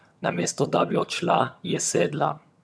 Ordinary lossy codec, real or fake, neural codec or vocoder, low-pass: none; fake; vocoder, 22.05 kHz, 80 mel bands, HiFi-GAN; none